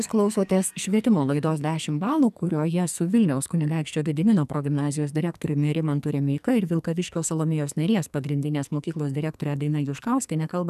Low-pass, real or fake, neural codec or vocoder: 14.4 kHz; fake; codec, 44.1 kHz, 2.6 kbps, SNAC